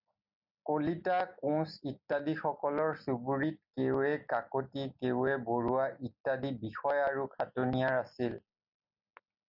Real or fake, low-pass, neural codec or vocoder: real; 5.4 kHz; none